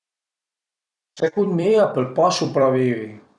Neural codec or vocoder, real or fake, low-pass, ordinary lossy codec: vocoder, 44.1 kHz, 128 mel bands every 256 samples, BigVGAN v2; fake; 10.8 kHz; none